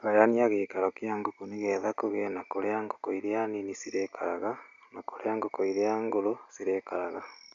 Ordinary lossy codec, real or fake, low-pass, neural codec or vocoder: AAC, 64 kbps; real; 7.2 kHz; none